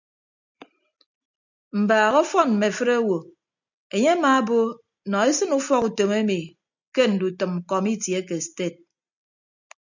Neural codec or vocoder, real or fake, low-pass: none; real; 7.2 kHz